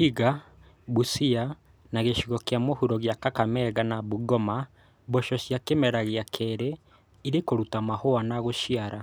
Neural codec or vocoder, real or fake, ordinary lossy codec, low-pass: none; real; none; none